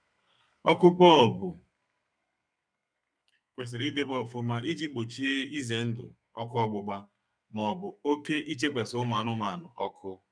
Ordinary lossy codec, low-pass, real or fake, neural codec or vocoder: none; 9.9 kHz; fake; codec, 32 kHz, 1.9 kbps, SNAC